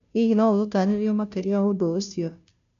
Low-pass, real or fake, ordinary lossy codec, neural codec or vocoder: 7.2 kHz; fake; none; codec, 16 kHz, 0.5 kbps, FunCodec, trained on Chinese and English, 25 frames a second